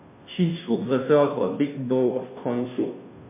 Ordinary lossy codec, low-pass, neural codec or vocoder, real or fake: MP3, 24 kbps; 3.6 kHz; codec, 16 kHz, 0.5 kbps, FunCodec, trained on Chinese and English, 25 frames a second; fake